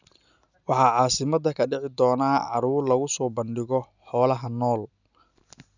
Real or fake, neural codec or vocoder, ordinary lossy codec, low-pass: real; none; none; 7.2 kHz